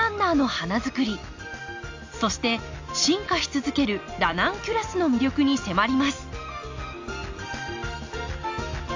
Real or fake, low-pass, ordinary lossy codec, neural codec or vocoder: real; 7.2 kHz; AAC, 48 kbps; none